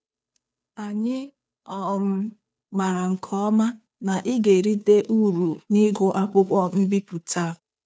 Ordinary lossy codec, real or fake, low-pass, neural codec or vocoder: none; fake; none; codec, 16 kHz, 2 kbps, FunCodec, trained on Chinese and English, 25 frames a second